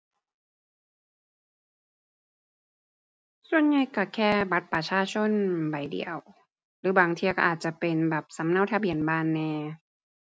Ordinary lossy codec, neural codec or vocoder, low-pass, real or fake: none; none; none; real